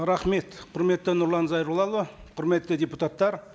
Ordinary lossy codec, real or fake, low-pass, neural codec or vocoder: none; real; none; none